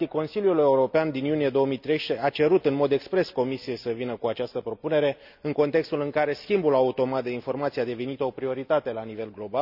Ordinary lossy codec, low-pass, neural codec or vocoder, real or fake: none; 5.4 kHz; none; real